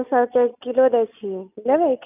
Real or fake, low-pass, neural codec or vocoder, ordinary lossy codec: real; 3.6 kHz; none; none